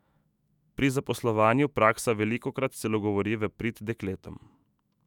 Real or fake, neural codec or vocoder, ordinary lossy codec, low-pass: fake; autoencoder, 48 kHz, 128 numbers a frame, DAC-VAE, trained on Japanese speech; none; 19.8 kHz